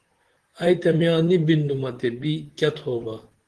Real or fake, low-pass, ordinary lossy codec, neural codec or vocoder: fake; 10.8 kHz; Opus, 16 kbps; vocoder, 44.1 kHz, 128 mel bands, Pupu-Vocoder